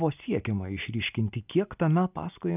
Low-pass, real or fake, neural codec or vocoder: 3.6 kHz; real; none